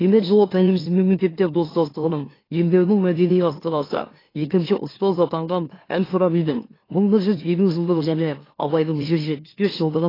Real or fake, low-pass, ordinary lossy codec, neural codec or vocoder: fake; 5.4 kHz; AAC, 24 kbps; autoencoder, 44.1 kHz, a latent of 192 numbers a frame, MeloTTS